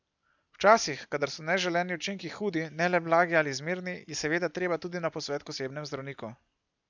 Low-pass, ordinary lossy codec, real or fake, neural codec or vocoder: 7.2 kHz; none; real; none